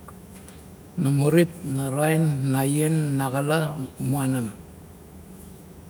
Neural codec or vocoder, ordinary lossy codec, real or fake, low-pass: autoencoder, 48 kHz, 128 numbers a frame, DAC-VAE, trained on Japanese speech; none; fake; none